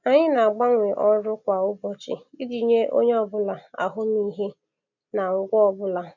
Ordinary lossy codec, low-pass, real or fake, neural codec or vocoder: none; 7.2 kHz; real; none